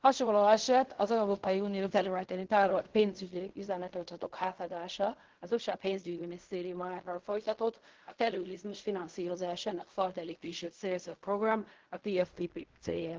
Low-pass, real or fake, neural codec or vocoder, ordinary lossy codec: 7.2 kHz; fake; codec, 16 kHz in and 24 kHz out, 0.4 kbps, LongCat-Audio-Codec, fine tuned four codebook decoder; Opus, 16 kbps